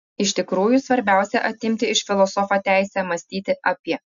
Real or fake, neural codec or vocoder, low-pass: real; none; 7.2 kHz